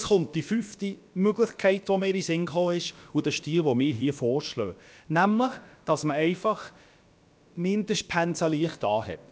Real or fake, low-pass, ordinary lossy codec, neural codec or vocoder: fake; none; none; codec, 16 kHz, about 1 kbps, DyCAST, with the encoder's durations